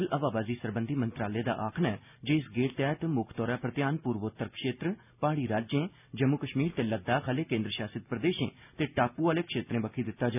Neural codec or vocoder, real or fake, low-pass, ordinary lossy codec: none; real; 3.6 kHz; none